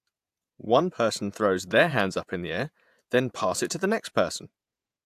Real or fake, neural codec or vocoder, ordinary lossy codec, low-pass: fake; vocoder, 48 kHz, 128 mel bands, Vocos; AAC, 96 kbps; 14.4 kHz